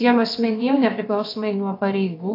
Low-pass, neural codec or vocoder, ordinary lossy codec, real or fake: 5.4 kHz; codec, 16 kHz, about 1 kbps, DyCAST, with the encoder's durations; AAC, 32 kbps; fake